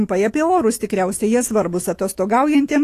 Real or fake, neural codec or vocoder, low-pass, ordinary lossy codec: fake; autoencoder, 48 kHz, 128 numbers a frame, DAC-VAE, trained on Japanese speech; 14.4 kHz; AAC, 48 kbps